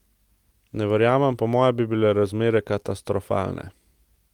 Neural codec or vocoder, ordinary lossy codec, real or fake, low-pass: none; Opus, 32 kbps; real; 19.8 kHz